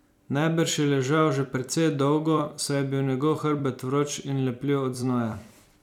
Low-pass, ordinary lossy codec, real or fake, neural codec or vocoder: 19.8 kHz; none; fake; vocoder, 44.1 kHz, 128 mel bands every 256 samples, BigVGAN v2